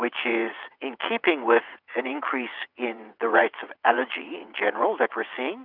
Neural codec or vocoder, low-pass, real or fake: vocoder, 22.05 kHz, 80 mel bands, WaveNeXt; 5.4 kHz; fake